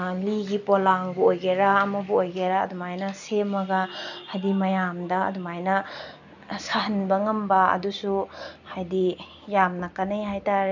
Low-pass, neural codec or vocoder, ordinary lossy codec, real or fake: 7.2 kHz; none; none; real